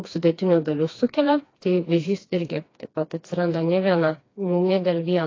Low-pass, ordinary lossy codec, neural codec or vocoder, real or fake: 7.2 kHz; AAC, 32 kbps; codec, 16 kHz, 2 kbps, FreqCodec, smaller model; fake